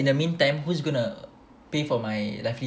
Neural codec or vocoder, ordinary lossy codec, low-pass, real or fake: none; none; none; real